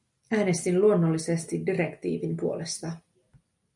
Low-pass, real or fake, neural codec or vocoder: 10.8 kHz; real; none